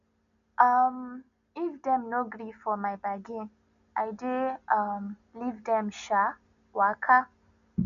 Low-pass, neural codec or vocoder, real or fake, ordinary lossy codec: 7.2 kHz; none; real; none